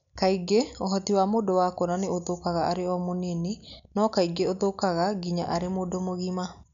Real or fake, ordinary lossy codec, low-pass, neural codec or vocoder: real; none; 7.2 kHz; none